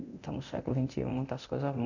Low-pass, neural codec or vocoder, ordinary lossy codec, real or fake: 7.2 kHz; codec, 24 kHz, 0.9 kbps, DualCodec; none; fake